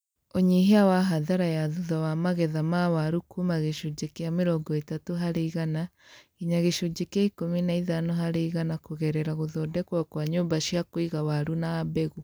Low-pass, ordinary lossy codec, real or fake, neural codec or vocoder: none; none; real; none